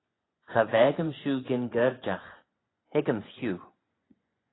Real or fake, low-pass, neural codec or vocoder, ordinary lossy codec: fake; 7.2 kHz; vocoder, 24 kHz, 100 mel bands, Vocos; AAC, 16 kbps